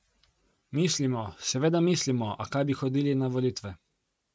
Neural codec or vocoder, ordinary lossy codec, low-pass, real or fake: none; none; none; real